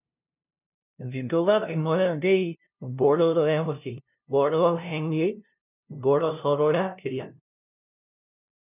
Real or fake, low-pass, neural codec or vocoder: fake; 3.6 kHz; codec, 16 kHz, 0.5 kbps, FunCodec, trained on LibriTTS, 25 frames a second